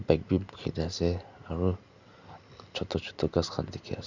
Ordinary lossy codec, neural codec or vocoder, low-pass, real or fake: none; none; 7.2 kHz; real